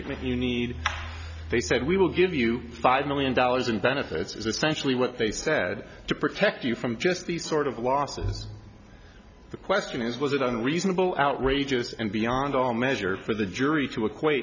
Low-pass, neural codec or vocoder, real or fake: 7.2 kHz; none; real